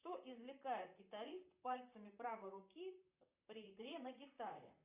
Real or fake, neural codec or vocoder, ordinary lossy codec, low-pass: fake; vocoder, 44.1 kHz, 128 mel bands, Pupu-Vocoder; Opus, 64 kbps; 3.6 kHz